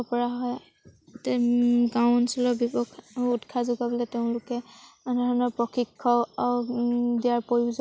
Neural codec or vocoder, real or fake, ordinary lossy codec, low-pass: none; real; none; none